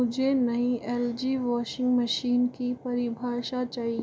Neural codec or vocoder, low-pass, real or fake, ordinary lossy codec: none; none; real; none